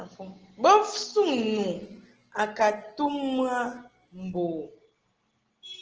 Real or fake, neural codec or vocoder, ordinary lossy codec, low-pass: real; none; Opus, 16 kbps; 7.2 kHz